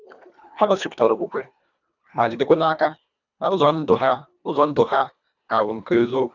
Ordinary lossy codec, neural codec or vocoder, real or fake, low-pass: none; codec, 24 kHz, 1.5 kbps, HILCodec; fake; 7.2 kHz